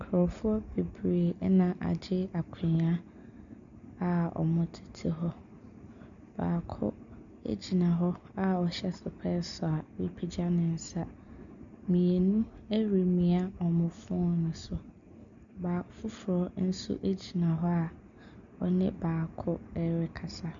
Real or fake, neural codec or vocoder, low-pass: real; none; 7.2 kHz